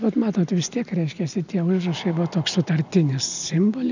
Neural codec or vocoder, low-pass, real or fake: none; 7.2 kHz; real